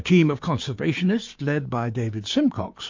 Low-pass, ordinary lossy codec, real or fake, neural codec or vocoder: 7.2 kHz; MP3, 48 kbps; fake; codec, 16 kHz, 6 kbps, DAC